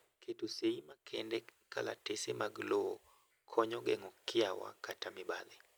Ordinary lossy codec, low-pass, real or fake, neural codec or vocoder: none; none; real; none